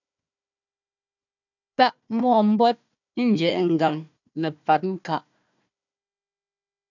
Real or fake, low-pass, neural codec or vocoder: fake; 7.2 kHz; codec, 16 kHz, 1 kbps, FunCodec, trained on Chinese and English, 50 frames a second